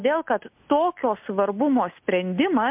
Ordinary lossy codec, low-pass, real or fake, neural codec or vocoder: MP3, 32 kbps; 3.6 kHz; real; none